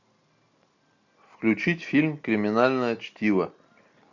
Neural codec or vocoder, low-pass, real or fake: none; 7.2 kHz; real